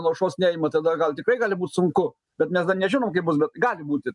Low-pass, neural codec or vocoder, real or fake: 10.8 kHz; none; real